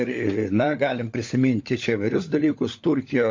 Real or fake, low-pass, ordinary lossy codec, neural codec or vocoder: fake; 7.2 kHz; MP3, 48 kbps; codec, 16 kHz, 16 kbps, FunCodec, trained on LibriTTS, 50 frames a second